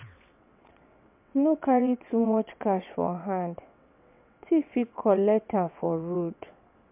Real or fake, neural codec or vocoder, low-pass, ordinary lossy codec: fake; vocoder, 22.05 kHz, 80 mel bands, WaveNeXt; 3.6 kHz; MP3, 32 kbps